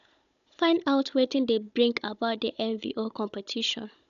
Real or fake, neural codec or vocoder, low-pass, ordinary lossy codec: fake; codec, 16 kHz, 16 kbps, FunCodec, trained on Chinese and English, 50 frames a second; 7.2 kHz; none